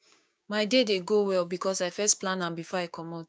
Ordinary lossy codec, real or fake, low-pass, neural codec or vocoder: none; fake; none; codec, 16 kHz, 6 kbps, DAC